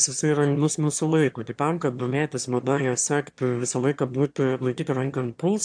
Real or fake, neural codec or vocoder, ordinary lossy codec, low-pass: fake; autoencoder, 22.05 kHz, a latent of 192 numbers a frame, VITS, trained on one speaker; AAC, 64 kbps; 9.9 kHz